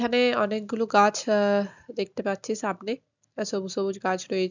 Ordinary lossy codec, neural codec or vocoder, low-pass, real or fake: none; none; 7.2 kHz; real